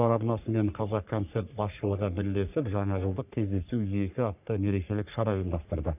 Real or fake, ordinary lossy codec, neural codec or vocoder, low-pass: fake; none; codec, 44.1 kHz, 3.4 kbps, Pupu-Codec; 3.6 kHz